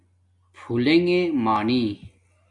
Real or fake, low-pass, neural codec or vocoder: real; 10.8 kHz; none